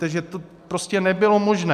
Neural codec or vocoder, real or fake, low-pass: none; real; 14.4 kHz